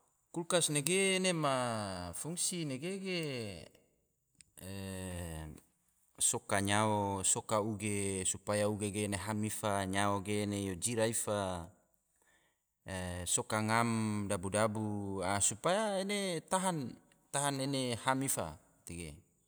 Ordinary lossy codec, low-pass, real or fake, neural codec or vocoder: none; none; real; none